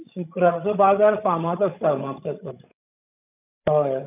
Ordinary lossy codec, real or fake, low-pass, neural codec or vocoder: none; fake; 3.6 kHz; codec, 16 kHz, 16 kbps, FreqCodec, larger model